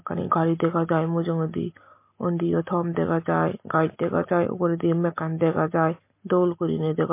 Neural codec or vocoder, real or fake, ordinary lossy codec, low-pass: none; real; MP3, 24 kbps; 3.6 kHz